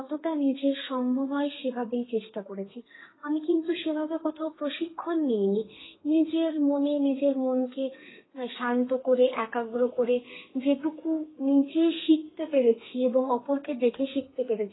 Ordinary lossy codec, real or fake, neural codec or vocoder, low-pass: AAC, 16 kbps; fake; codec, 32 kHz, 1.9 kbps, SNAC; 7.2 kHz